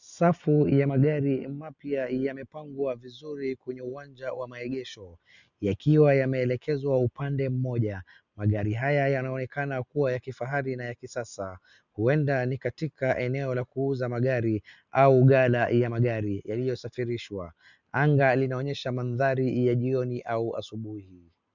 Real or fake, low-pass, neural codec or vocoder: real; 7.2 kHz; none